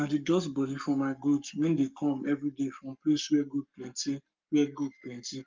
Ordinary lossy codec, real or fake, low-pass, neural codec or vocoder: Opus, 24 kbps; fake; 7.2 kHz; codec, 44.1 kHz, 7.8 kbps, Pupu-Codec